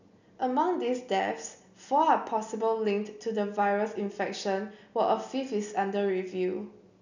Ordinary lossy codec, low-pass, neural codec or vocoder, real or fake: none; 7.2 kHz; none; real